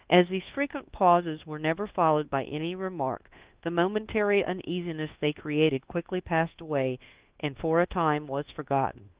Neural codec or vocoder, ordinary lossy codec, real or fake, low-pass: codec, 24 kHz, 1.2 kbps, DualCodec; Opus, 16 kbps; fake; 3.6 kHz